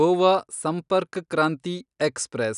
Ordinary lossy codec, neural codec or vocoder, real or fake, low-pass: none; none; real; 10.8 kHz